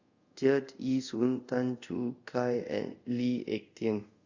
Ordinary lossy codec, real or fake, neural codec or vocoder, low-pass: Opus, 64 kbps; fake; codec, 24 kHz, 0.5 kbps, DualCodec; 7.2 kHz